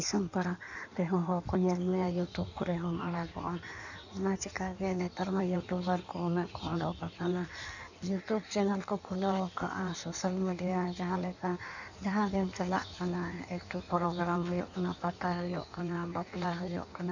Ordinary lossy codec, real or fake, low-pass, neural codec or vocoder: none; fake; 7.2 kHz; codec, 16 kHz in and 24 kHz out, 1.1 kbps, FireRedTTS-2 codec